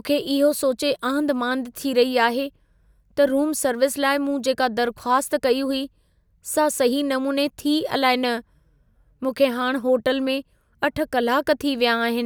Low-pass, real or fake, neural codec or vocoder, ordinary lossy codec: none; real; none; none